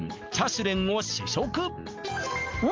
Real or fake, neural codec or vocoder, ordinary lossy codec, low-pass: real; none; Opus, 32 kbps; 7.2 kHz